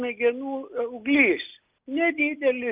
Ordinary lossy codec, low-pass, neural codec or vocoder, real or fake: Opus, 24 kbps; 3.6 kHz; none; real